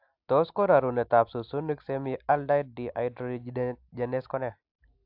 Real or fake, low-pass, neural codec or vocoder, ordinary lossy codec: real; 5.4 kHz; none; AAC, 48 kbps